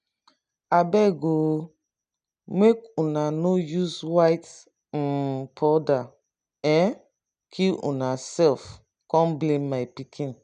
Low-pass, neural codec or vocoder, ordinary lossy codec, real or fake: 9.9 kHz; none; none; real